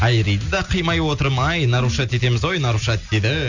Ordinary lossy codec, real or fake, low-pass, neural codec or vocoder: none; real; 7.2 kHz; none